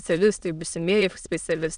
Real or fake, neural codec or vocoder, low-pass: fake; autoencoder, 22.05 kHz, a latent of 192 numbers a frame, VITS, trained on many speakers; 9.9 kHz